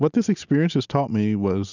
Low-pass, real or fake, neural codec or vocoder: 7.2 kHz; real; none